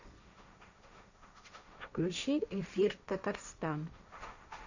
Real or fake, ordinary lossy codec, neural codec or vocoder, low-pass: fake; none; codec, 16 kHz, 1.1 kbps, Voila-Tokenizer; 7.2 kHz